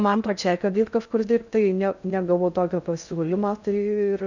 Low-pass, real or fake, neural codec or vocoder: 7.2 kHz; fake; codec, 16 kHz in and 24 kHz out, 0.6 kbps, FocalCodec, streaming, 2048 codes